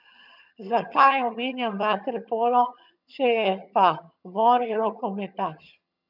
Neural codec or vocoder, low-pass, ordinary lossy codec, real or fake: vocoder, 22.05 kHz, 80 mel bands, HiFi-GAN; 5.4 kHz; none; fake